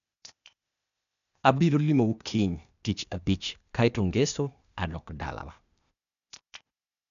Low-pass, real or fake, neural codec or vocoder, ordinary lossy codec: 7.2 kHz; fake; codec, 16 kHz, 0.8 kbps, ZipCodec; none